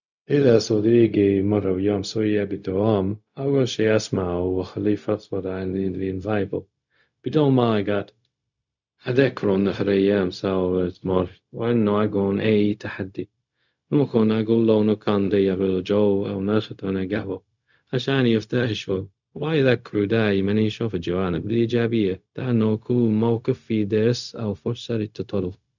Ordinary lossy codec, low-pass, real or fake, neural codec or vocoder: none; 7.2 kHz; fake; codec, 16 kHz, 0.4 kbps, LongCat-Audio-Codec